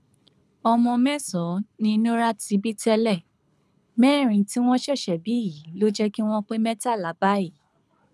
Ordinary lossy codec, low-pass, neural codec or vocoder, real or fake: none; none; codec, 24 kHz, 6 kbps, HILCodec; fake